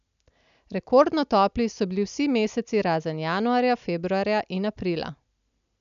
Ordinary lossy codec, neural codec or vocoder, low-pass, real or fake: none; none; 7.2 kHz; real